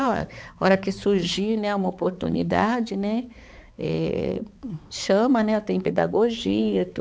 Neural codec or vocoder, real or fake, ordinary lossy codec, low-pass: codec, 16 kHz, 4 kbps, X-Codec, HuBERT features, trained on balanced general audio; fake; none; none